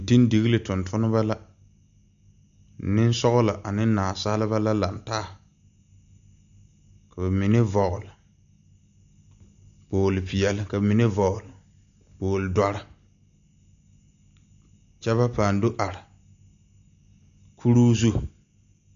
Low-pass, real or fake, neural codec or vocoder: 7.2 kHz; real; none